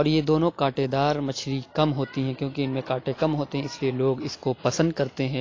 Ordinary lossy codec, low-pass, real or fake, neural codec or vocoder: AAC, 32 kbps; 7.2 kHz; real; none